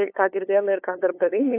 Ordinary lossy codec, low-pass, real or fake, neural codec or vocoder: AAC, 24 kbps; 3.6 kHz; fake; codec, 16 kHz, 8 kbps, FunCodec, trained on LibriTTS, 25 frames a second